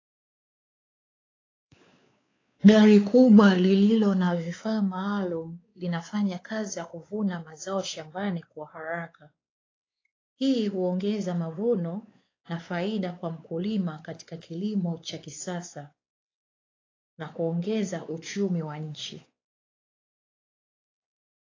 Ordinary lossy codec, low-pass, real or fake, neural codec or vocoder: AAC, 32 kbps; 7.2 kHz; fake; codec, 16 kHz, 4 kbps, X-Codec, WavLM features, trained on Multilingual LibriSpeech